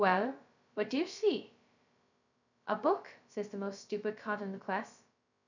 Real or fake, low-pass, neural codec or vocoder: fake; 7.2 kHz; codec, 16 kHz, 0.2 kbps, FocalCodec